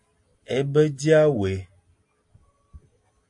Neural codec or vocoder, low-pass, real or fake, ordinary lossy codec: none; 10.8 kHz; real; AAC, 64 kbps